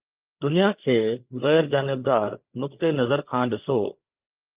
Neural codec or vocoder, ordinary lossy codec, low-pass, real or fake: codec, 16 kHz, 8 kbps, FreqCodec, larger model; Opus, 24 kbps; 3.6 kHz; fake